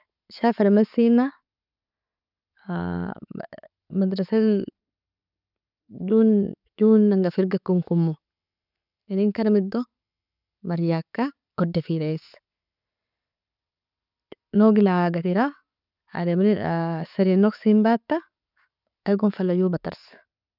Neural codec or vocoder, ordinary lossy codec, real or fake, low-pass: none; none; real; 5.4 kHz